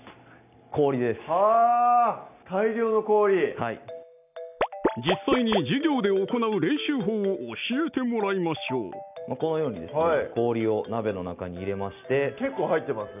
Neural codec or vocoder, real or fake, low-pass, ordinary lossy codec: none; real; 3.6 kHz; none